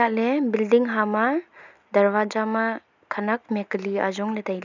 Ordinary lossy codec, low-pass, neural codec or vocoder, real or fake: none; 7.2 kHz; none; real